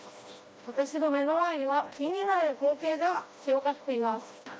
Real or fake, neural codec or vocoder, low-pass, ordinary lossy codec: fake; codec, 16 kHz, 1 kbps, FreqCodec, smaller model; none; none